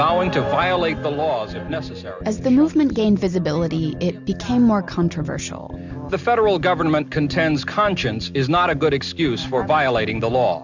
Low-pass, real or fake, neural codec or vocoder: 7.2 kHz; real; none